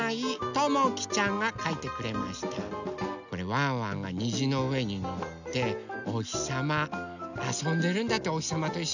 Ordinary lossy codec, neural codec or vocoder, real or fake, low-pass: none; none; real; 7.2 kHz